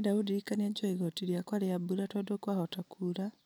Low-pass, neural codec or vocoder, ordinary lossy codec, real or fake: none; none; none; real